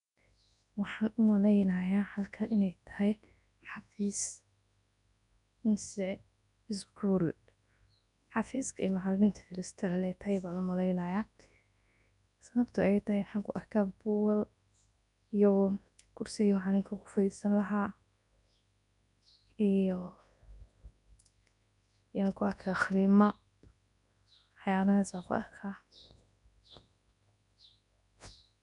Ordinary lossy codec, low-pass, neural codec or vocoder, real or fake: none; 10.8 kHz; codec, 24 kHz, 0.9 kbps, WavTokenizer, large speech release; fake